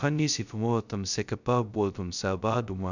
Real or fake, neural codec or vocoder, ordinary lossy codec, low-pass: fake; codec, 16 kHz, 0.2 kbps, FocalCodec; none; 7.2 kHz